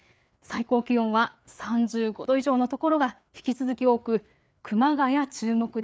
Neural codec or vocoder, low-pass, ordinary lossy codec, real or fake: codec, 16 kHz, 4 kbps, FreqCodec, larger model; none; none; fake